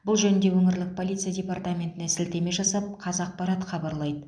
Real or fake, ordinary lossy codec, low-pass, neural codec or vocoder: real; none; 9.9 kHz; none